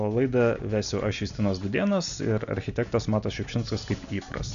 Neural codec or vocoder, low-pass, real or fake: none; 7.2 kHz; real